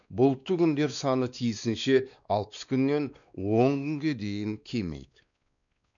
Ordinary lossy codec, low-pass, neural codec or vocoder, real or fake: none; 7.2 kHz; codec, 16 kHz, 2 kbps, X-Codec, WavLM features, trained on Multilingual LibriSpeech; fake